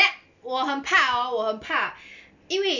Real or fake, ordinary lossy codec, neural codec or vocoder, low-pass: real; none; none; 7.2 kHz